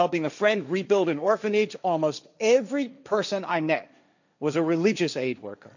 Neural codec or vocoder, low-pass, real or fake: codec, 16 kHz, 1.1 kbps, Voila-Tokenizer; 7.2 kHz; fake